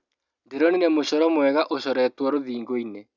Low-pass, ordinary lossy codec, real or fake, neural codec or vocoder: 7.2 kHz; none; real; none